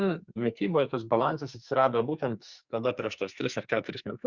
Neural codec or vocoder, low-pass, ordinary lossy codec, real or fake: codec, 44.1 kHz, 2.6 kbps, SNAC; 7.2 kHz; Opus, 64 kbps; fake